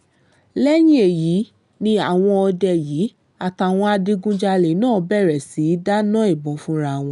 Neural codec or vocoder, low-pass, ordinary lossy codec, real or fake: none; 10.8 kHz; none; real